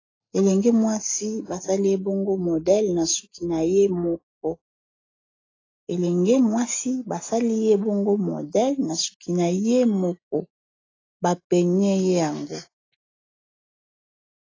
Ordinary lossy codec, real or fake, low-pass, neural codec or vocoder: AAC, 32 kbps; real; 7.2 kHz; none